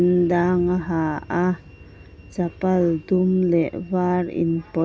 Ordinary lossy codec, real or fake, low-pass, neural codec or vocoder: none; real; none; none